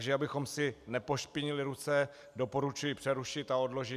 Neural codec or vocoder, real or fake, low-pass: none; real; 14.4 kHz